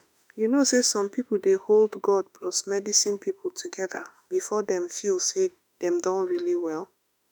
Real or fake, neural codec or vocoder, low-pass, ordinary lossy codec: fake; autoencoder, 48 kHz, 32 numbers a frame, DAC-VAE, trained on Japanese speech; none; none